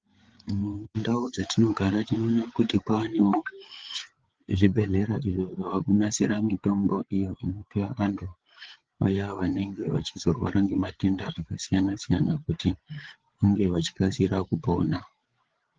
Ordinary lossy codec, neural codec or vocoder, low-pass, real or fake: Opus, 16 kbps; codec, 16 kHz, 8 kbps, FreqCodec, larger model; 7.2 kHz; fake